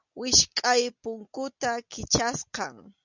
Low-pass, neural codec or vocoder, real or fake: 7.2 kHz; none; real